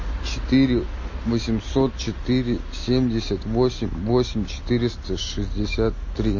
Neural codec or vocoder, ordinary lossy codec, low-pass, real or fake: none; MP3, 32 kbps; 7.2 kHz; real